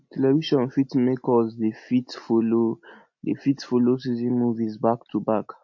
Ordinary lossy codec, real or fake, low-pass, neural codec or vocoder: MP3, 48 kbps; real; 7.2 kHz; none